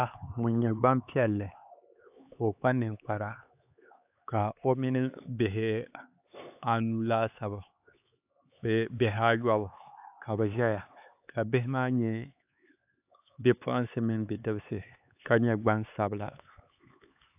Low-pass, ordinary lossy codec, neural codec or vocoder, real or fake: 3.6 kHz; AAC, 32 kbps; codec, 16 kHz, 4 kbps, X-Codec, HuBERT features, trained on LibriSpeech; fake